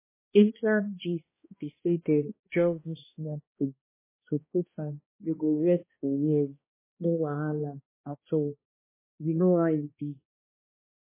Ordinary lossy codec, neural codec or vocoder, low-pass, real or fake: MP3, 16 kbps; codec, 16 kHz, 1 kbps, X-Codec, HuBERT features, trained on balanced general audio; 3.6 kHz; fake